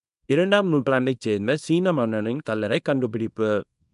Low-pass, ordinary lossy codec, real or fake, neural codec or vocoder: 10.8 kHz; none; fake; codec, 24 kHz, 0.9 kbps, WavTokenizer, small release